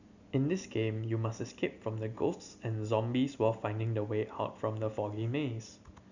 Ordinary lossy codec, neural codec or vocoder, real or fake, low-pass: none; none; real; 7.2 kHz